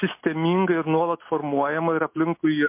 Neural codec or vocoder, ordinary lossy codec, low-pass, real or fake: none; MP3, 24 kbps; 3.6 kHz; real